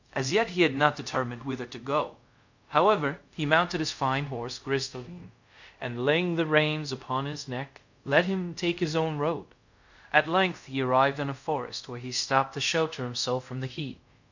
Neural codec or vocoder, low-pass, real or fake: codec, 24 kHz, 0.5 kbps, DualCodec; 7.2 kHz; fake